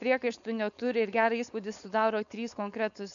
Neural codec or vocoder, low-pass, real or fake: codec, 16 kHz, 4.8 kbps, FACodec; 7.2 kHz; fake